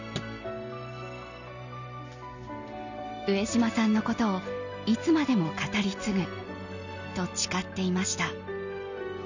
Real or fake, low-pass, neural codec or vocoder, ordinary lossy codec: real; 7.2 kHz; none; none